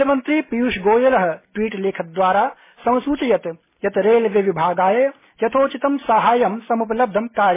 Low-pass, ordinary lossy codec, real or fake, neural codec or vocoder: 3.6 kHz; MP3, 16 kbps; real; none